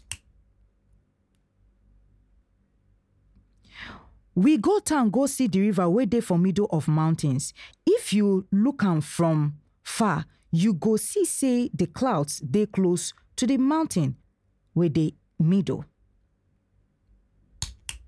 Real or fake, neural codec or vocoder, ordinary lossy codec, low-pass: real; none; none; none